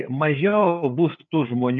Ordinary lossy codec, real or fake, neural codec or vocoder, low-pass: MP3, 96 kbps; fake; codec, 16 kHz, 4 kbps, FreqCodec, larger model; 7.2 kHz